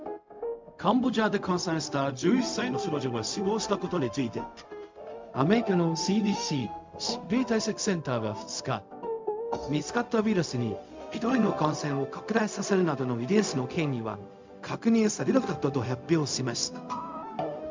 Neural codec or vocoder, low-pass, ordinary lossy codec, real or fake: codec, 16 kHz, 0.4 kbps, LongCat-Audio-Codec; 7.2 kHz; MP3, 64 kbps; fake